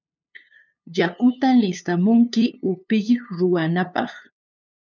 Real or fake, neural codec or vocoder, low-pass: fake; codec, 16 kHz, 2 kbps, FunCodec, trained on LibriTTS, 25 frames a second; 7.2 kHz